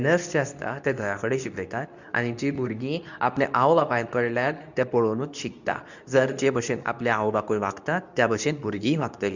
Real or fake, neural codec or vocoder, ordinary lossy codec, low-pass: fake; codec, 24 kHz, 0.9 kbps, WavTokenizer, medium speech release version 2; none; 7.2 kHz